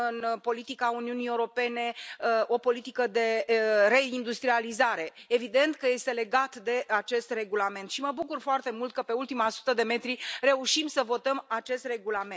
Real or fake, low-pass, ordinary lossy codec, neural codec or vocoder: real; none; none; none